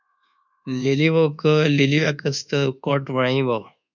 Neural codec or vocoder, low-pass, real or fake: codec, 24 kHz, 1.2 kbps, DualCodec; 7.2 kHz; fake